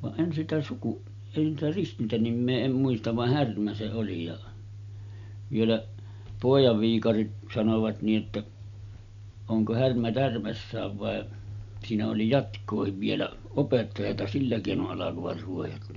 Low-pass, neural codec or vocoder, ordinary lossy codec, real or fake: 7.2 kHz; none; MP3, 64 kbps; real